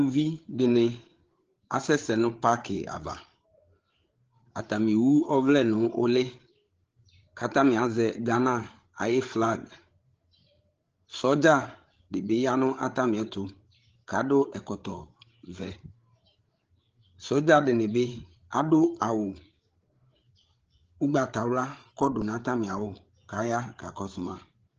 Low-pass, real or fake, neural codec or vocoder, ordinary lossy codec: 7.2 kHz; fake; codec, 16 kHz, 8 kbps, FreqCodec, larger model; Opus, 16 kbps